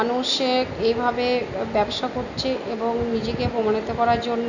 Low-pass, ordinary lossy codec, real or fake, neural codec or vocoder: 7.2 kHz; none; real; none